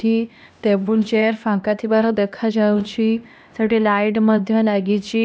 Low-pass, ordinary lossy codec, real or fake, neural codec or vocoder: none; none; fake; codec, 16 kHz, 1 kbps, X-Codec, HuBERT features, trained on LibriSpeech